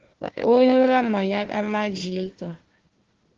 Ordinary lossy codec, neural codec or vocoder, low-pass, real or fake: Opus, 16 kbps; codec, 16 kHz, 1 kbps, FunCodec, trained on Chinese and English, 50 frames a second; 7.2 kHz; fake